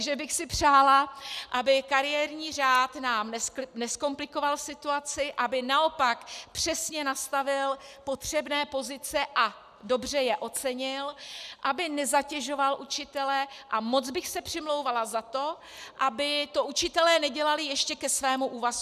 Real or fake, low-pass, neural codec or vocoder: real; 14.4 kHz; none